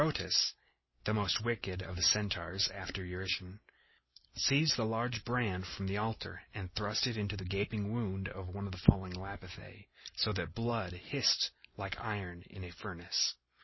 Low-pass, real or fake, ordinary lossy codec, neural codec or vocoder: 7.2 kHz; real; MP3, 24 kbps; none